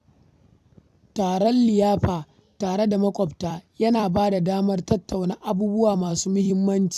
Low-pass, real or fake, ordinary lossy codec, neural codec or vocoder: 14.4 kHz; fake; none; vocoder, 44.1 kHz, 128 mel bands every 256 samples, BigVGAN v2